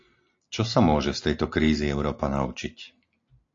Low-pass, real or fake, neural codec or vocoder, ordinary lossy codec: 7.2 kHz; real; none; AAC, 48 kbps